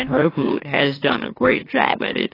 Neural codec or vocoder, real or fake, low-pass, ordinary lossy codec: autoencoder, 44.1 kHz, a latent of 192 numbers a frame, MeloTTS; fake; 5.4 kHz; AAC, 24 kbps